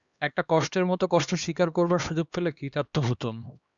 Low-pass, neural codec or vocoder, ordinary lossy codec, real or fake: 7.2 kHz; codec, 16 kHz, 2 kbps, X-Codec, HuBERT features, trained on LibriSpeech; Opus, 64 kbps; fake